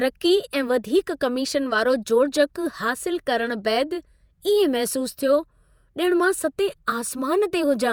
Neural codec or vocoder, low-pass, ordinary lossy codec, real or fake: vocoder, 48 kHz, 128 mel bands, Vocos; none; none; fake